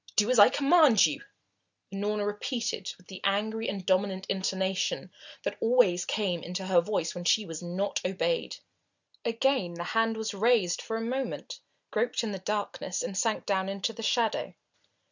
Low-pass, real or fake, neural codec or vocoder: 7.2 kHz; real; none